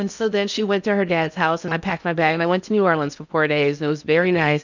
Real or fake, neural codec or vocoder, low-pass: fake; codec, 16 kHz in and 24 kHz out, 0.8 kbps, FocalCodec, streaming, 65536 codes; 7.2 kHz